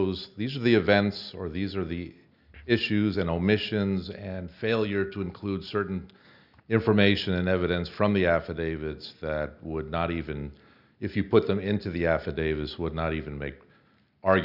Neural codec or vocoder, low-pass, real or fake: none; 5.4 kHz; real